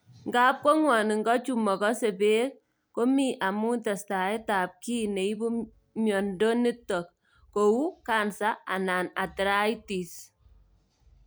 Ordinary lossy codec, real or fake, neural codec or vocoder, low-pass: none; real; none; none